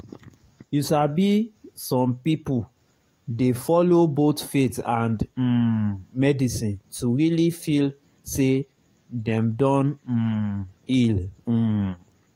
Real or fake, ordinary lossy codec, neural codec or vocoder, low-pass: fake; AAC, 48 kbps; codec, 44.1 kHz, 7.8 kbps, DAC; 19.8 kHz